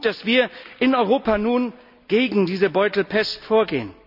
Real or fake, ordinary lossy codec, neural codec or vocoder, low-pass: real; none; none; 5.4 kHz